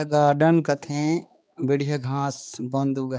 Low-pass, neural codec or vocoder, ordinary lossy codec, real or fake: none; codec, 16 kHz, 2 kbps, X-Codec, HuBERT features, trained on balanced general audio; none; fake